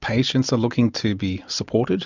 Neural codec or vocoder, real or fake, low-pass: none; real; 7.2 kHz